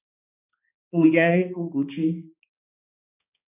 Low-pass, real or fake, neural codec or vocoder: 3.6 kHz; fake; codec, 16 kHz, 2 kbps, X-Codec, HuBERT features, trained on balanced general audio